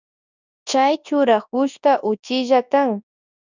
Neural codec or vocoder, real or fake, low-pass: codec, 24 kHz, 0.9 kbps, WavTokenizer, large speech release; fake; 7.2 kHz